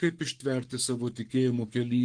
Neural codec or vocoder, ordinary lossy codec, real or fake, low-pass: codec, 44.1 kHz, 7.8 kbps, Pupu-Codec; Opus, 24 kbps; fake; 9.9 kHz